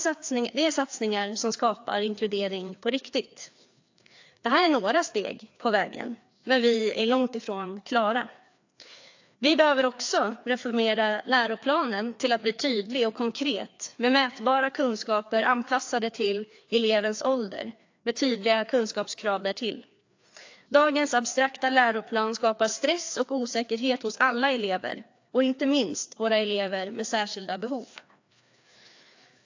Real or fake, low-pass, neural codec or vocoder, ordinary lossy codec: fake; 7.2 kHz; codec, 16 kHz, 2 kbps, FreqCodec, larger model; AAC, 48 kbps